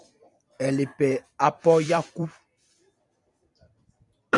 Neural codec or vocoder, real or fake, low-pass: vocoder, 44.1 kHz, 128 mel bands every 256 samples, BigVGAN v2; fake; 10.8 kHz